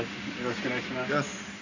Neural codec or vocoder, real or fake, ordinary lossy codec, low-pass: none; real; none; 7.2 kHz